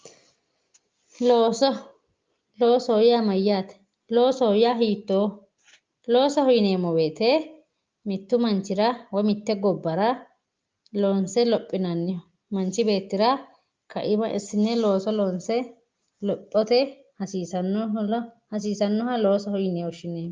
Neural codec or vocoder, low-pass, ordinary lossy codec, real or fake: none; 7.2 kHz; Opus, 24 kbps; real